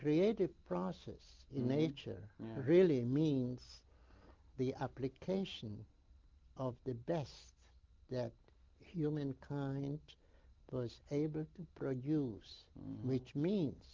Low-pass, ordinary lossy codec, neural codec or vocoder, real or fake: 7.2 kHz; Opus, 32 kbps; none; real